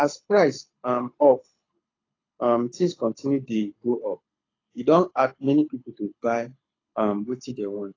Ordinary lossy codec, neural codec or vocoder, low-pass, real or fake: AAC, 32 kbps; codec, 24 kHz, 6 kbps, HILCodec; 7.2 kHz; fake